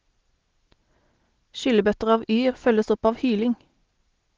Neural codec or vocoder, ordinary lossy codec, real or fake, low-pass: none; Opus, 16 kbps; real; 7.2 kHz